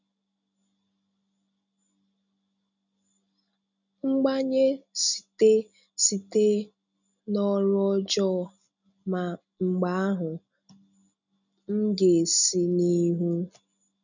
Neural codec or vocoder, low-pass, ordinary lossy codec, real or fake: none; 7.2 kHz; none; real